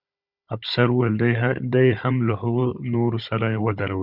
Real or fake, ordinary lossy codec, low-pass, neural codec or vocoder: fake; Opus, 64 kbps; 5.4 kHz; codec, 16 kHz, 16 kbps, FunCodec, trained on Chinese and English, 50 frames a second